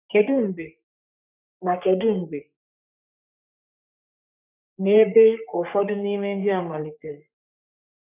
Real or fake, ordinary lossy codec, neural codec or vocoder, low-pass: fake; none; codec, 44.1 kHz, 3.4 kbps, Pupu-Codec; 3.6 kHz